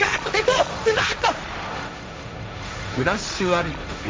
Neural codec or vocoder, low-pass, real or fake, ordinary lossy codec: codec, 16 kHz, 1.1 kbps, Voila-Tokenizer; none; fake; none